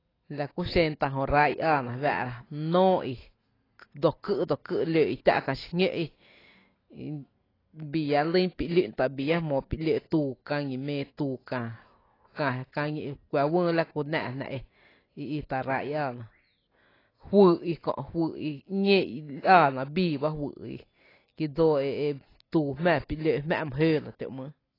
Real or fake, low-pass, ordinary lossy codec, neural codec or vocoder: real; 5.4 kHz; AAC, 24 kbps; none